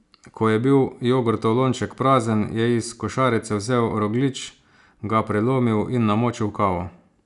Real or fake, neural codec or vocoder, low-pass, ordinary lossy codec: real; none; 10.8 kHz; none